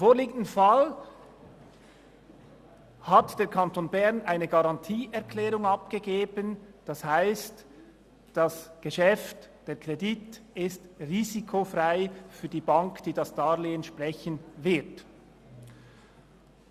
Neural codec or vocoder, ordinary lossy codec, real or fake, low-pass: none; Opus, 64 kbps; real; 14.4 kHz